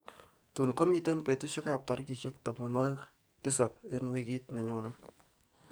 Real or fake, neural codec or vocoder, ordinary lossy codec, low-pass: fake; codec, 44.1 kHz, 2.6 kbps, SNAC; none; none